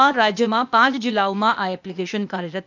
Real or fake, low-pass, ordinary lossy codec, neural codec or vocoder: fake; 7.2 kHz; none; codec, 16 kHz, 0.8 kbps, ZipCodec